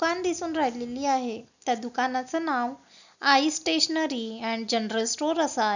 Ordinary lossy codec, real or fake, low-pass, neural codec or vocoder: none; real; 7.2 kHz; none